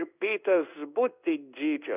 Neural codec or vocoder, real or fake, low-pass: codec, 16 kHz in and 24 kHz out, 1 kbps, XY-Tokenizer; fake; 3.6 kHz